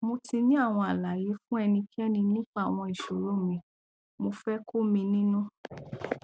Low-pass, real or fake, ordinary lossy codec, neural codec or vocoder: none; real; none; none